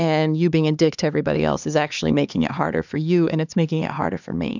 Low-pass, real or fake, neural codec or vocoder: 7.2 kHz; fake; codec, 16 kHz, 2 kbps, X-Codec, HuBERT features, trained on LibriSpeech